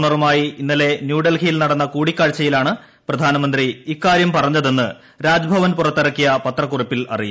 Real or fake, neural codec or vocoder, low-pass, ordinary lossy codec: real; none; none; none